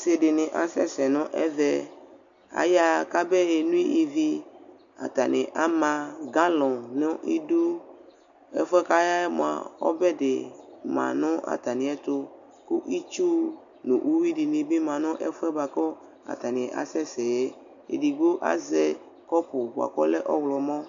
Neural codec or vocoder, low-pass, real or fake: none; 7.2 kHz; real